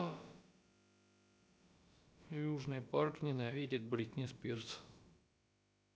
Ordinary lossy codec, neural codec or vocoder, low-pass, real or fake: none; codec, 16 kHz, about 1 kbps, DyCAST, with the encoder's durations; none; fake